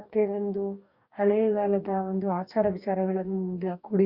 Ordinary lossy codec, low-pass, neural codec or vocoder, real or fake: Opus, 64 kbps; 5.4 kHz; codec, 44.1 kHz, 2.6 kbps, DAC; fake